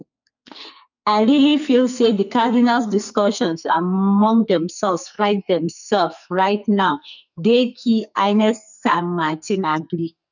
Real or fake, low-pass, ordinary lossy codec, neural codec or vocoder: fake; 7.2 kHz; none; codec, 44.1 kHz, 2.6 kbps, SNAC